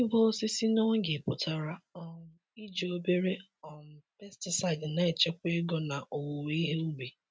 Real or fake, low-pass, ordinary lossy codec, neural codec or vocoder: real; none; none; none